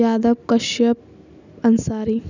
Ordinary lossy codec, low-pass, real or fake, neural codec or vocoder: none; 7.2 kHz; real; none